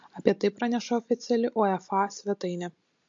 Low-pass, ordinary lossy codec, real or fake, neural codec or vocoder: 7.2 kHz; MP3, 48 kbps; real; none